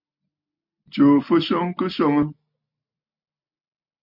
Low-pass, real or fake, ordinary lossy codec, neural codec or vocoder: 5.4 kHz; real; MP3, 48 kbps; none